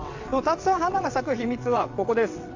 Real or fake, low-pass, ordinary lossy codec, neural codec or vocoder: fake; 7.2 kHz; AAC, 48 kbps; vocoder, 22.05 kHz, 80 mel bands, WaveNeXt